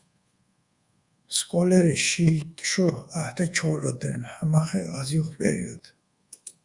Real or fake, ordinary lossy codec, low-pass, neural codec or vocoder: fake; Opus, 64 kbps; 10.8 kHz; codec, 24 kHz, 1.2 kbps, DualCodec